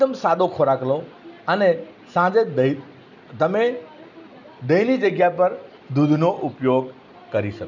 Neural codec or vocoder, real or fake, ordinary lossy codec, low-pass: none; real; none; 7.2 kHz